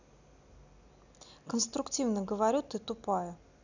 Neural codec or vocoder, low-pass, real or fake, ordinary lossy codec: none; 7.2 kHz; real; none